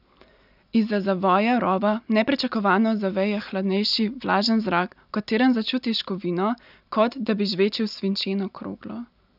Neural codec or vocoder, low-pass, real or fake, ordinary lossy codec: none; 5.4 kHz; real; none